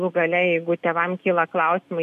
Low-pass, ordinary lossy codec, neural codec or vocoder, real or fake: 14.4 kHz; MP3, 96 kbps; none; real